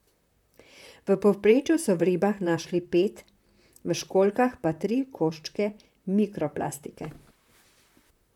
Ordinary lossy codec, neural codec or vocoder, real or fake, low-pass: none; vocoder, 44.1 kHz, 128 mel bands, Pupu-Vocoder; fake; 19.8 kHz